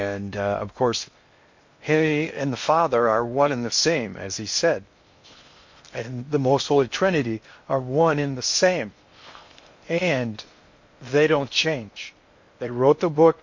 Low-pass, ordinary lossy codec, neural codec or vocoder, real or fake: 7.2 kHz; MP3, 48 kbps; codec, 16 kHz in and 24 kHz out, 0.6 kbps, FocalCodec, streaming, 4096 codes; fake